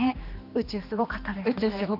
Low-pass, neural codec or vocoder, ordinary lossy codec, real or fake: 5.4 kHz; codec, 16 kHz, 2 kbps, X-Codec, HuBERT features, trained on general audio; none; fake